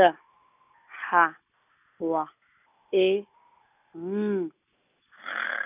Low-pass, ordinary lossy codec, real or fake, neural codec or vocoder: 3.6 kHz; none; real; none